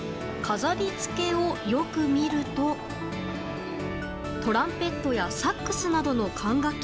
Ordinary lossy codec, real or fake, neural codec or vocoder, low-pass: none; real; none; none